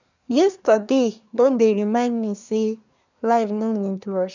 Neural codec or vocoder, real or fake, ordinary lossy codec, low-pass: codec, 24 kHz, 1 kbps, SNAC; fake; none; 7.2 kHz